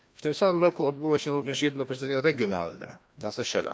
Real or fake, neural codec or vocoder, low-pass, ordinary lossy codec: fake; codec, 16 kHz, 1 kbps, FreqCodec, larger model; none; none